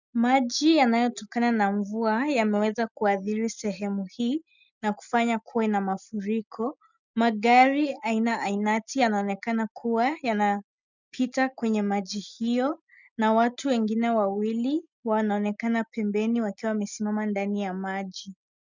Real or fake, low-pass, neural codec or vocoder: real; 7.2 kHz; none